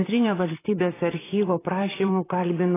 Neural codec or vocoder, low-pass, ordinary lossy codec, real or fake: vocoder, 44.1 kHz, 128 mel bands, Pupu-Vocoder; 3.6 kHz; AAC, 16 kbps; fake